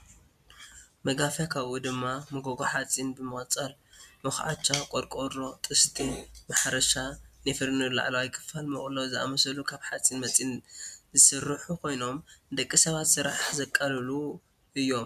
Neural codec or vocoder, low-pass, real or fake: none; 14.4 kHz; real